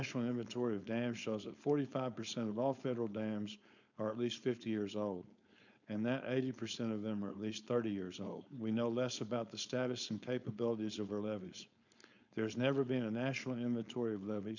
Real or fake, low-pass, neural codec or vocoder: fake; 7.2 kHz; codec, 16 kHz, 4.8 kbps, FACodec